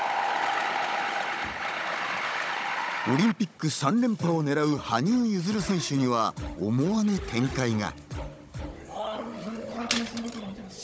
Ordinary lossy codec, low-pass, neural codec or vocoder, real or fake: none; none; codec, 16 kHz, 16 kbps, FunCodec, trained on Chinese and English, 50 frames a second; fake